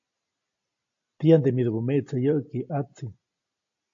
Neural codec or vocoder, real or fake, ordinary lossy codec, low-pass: none; real; AAC, 48 kbps; 7.2 kHz